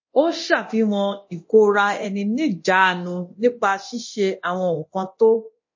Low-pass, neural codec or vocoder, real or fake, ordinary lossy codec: 7.2 kHz; codec, 24 kHz, 0.9 kbps, DualCodec; fake; MP3, 32 kbps